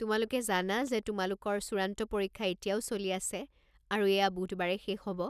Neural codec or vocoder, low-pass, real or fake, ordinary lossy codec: none; 14.4 kHz; real; none